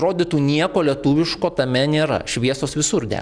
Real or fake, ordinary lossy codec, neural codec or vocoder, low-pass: real; Opus, 64 kbps; none; 9.9 kHz